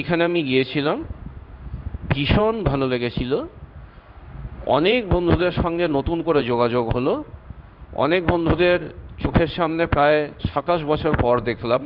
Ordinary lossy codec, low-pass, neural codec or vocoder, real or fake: none; 5.4 kHz; codec, 16 kHz in and 24 kHz out, 1 kbps, XY-Tokenizer; fake